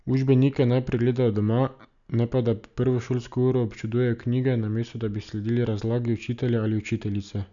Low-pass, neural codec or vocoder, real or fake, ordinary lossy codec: 7.2 kHz; none; real; none